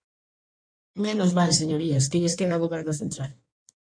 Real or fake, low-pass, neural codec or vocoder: fake; 9.9 kHz; codec, 16 kHz in and 24 kHz out, 1.1 kbps, FireRedTTS-2 codec